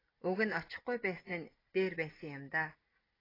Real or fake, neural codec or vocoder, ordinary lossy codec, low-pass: real; none; AAC, 24 kbps; 5.4 kHz